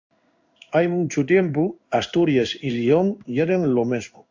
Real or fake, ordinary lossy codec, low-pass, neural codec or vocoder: fake; AAC, 48 kbps; 7.2 kHz; codec, 16 kHz in and 24 kHz out, 1 kbps, XY-Tokenizer